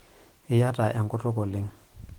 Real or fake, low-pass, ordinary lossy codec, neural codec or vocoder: fake; 19.8 kHz; Opus, 16 kbps; autoencoder, 48 kHz, 128 numbers a frame, DAC-VAE, trained on Japanese speech